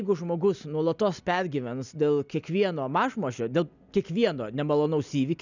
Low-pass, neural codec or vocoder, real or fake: 7.2 kHz; none; real